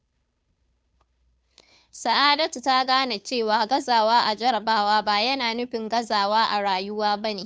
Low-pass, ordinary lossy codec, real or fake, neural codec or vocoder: none; none; fake; codec, 16 kHz, 2 kbps, FunCodec, trained on Chinese and English, 25 frames a second